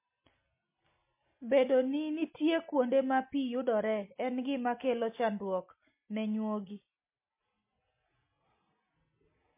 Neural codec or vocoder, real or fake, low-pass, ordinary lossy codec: none; real; 3.6 kHz; MP3, 24 kbps